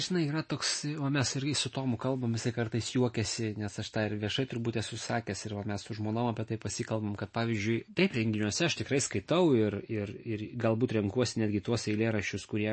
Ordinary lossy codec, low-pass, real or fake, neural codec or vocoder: MP3, 32 kbps; 9.9 kHz; real; none